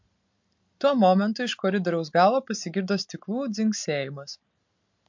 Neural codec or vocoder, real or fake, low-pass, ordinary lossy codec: none; real; 7.2 kHz; MP3, 48 kbps